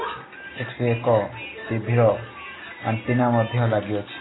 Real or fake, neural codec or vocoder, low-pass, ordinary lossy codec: real; none; 7.2 kHz; AAC, 16 kbps